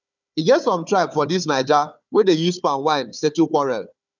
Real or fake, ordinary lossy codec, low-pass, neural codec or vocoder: fake; none; 7.2 kHz; codec, 16 kHz, 4 kbps, FunCodec, trained on Chinese and English, 50 frames a second